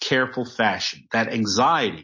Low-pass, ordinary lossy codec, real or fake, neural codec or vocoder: 7.2 kHz; MP3, 32 kbps; real; none